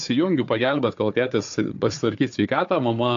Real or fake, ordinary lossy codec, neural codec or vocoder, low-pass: fake; AAC, 64 kbps; codec, 16 kHz, 4 kbps, FunCodec, trained on Chinese and English, 50 frames a second; 7.2 kHz